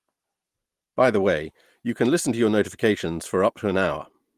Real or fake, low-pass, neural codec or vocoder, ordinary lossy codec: real; 14.4 kHz; none; Opus, 24 kbps